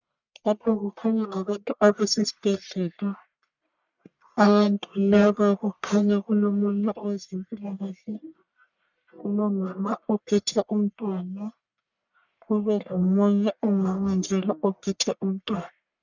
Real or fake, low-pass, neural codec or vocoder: fake; 7.2 kHz; codec, 44.1 kHz, 1.7 kbps, Pupu-Codec